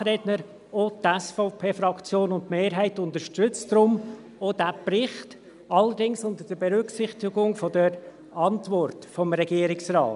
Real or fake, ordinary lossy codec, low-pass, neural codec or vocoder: real; none; 10.8 kHz; none